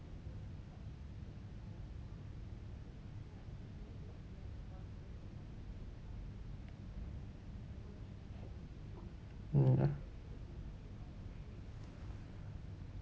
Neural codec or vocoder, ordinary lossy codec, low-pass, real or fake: none; none; none; real